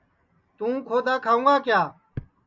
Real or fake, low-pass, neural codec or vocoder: real; 7.2 kHz; none